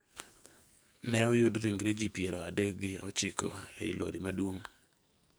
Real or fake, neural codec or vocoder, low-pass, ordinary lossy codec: fake; codec, 44.1 kHz, 2.6 kbps, SNAC; none; none